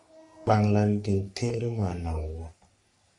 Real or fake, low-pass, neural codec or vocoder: fake; 10.8 kHz; codec, 44.1 kHz, 3.4 kbps, Pupu-Codec